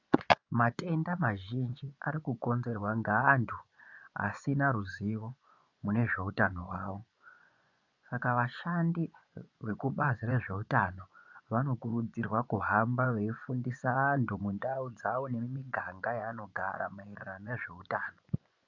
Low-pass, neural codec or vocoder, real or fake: 7.2 kHz; vocoder, 24 kHz, 100 mel bands, Vocos; fake